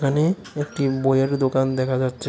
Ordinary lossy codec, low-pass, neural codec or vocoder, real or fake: none; none; none; real